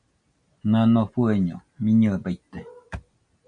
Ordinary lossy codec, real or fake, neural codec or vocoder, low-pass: MP3, 48 kbps; real; none; 9.9 kHz